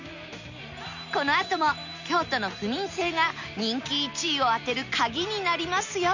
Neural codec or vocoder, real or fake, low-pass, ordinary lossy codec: none; real; 7.2 kHz; none